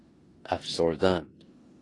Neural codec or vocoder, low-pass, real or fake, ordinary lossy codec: codec, 16 kHz in and 24 kHz out, 0.9 kbps, LongCat-Audio-Codec, four codebook decoder; 10.8 kHz; fake; AAC, 32 kbps